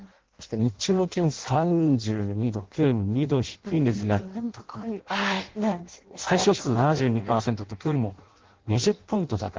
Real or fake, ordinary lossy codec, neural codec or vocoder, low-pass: fake; Opus, 16 kbps; codec, 16 kHz in and 24 kHz out, 0.6 kbps, FireRedTTS-2 codec; 7.2 kHz